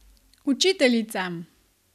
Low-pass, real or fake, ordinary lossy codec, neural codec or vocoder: 14.4 kHz; real; none; none